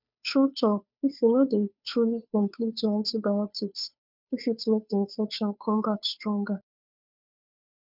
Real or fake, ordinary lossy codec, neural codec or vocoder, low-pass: fake; none; codec, 16 kHz, 2 kbps, FunCodec, trained on Chinese and English, 25 frames a second; 5.4 kHz